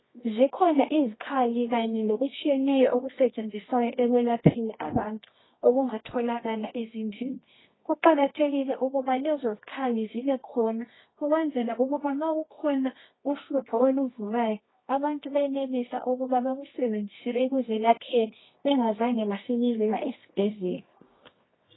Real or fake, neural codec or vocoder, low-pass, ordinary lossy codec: fake; codec, 24 kHz, 0.9 kbps, WavTokenizer, medium music audio release; 7.2 kHz; AAC, 16 kbps